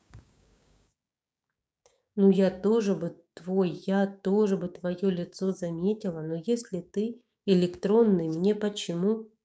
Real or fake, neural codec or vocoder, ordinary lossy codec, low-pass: fake; codec, 16 kHz, 6 kbps, DAC; none; none